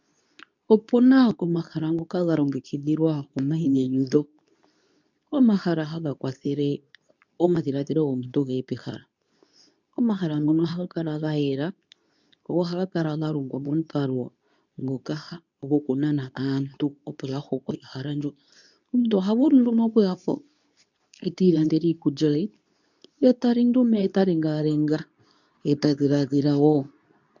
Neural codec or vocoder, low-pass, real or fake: codec, 24 kHz, 0.9 kbps, WavTokenizer, medium speech release version 2; 7.2 kHz; fake